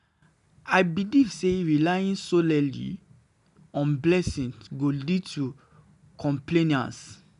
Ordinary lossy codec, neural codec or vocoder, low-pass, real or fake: none; none; 10.8 kHz; real